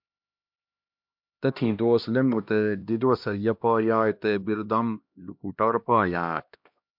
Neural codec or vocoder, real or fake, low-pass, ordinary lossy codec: codec, 16 kHz, 1 kbps, X-Codec, HuBERT features, trained on LibriSpeech; fake; 5.4 kHz; MP3, 48 kbps